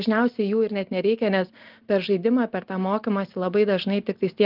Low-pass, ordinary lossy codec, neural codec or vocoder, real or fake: 5.4 kHz; Opus, 32 kbps; none; real